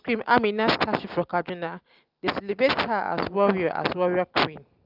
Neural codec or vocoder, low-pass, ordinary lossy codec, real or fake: none; 5.4 kHz; Opus, 32 kbps; real